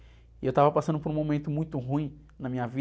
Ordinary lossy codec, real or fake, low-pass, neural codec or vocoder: none; real; none; none